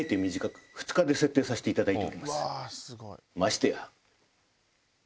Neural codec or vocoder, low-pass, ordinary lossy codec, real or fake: none; none; none; real